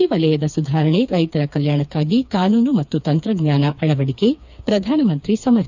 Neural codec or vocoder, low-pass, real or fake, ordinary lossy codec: codec, 16 kHz, 4 kbps, FreqCodec, smaller model; 7.2 kHz; fake; none